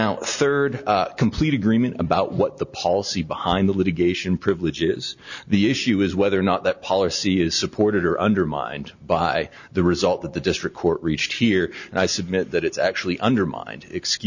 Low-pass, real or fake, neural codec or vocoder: 7.2 kHz; real; none